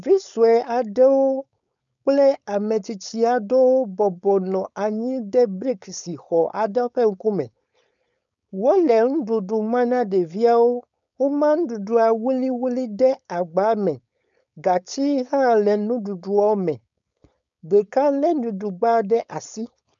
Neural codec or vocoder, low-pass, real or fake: codec, 16 kHz, 4.8 kbps, FACodec; 7.2 kHz; fake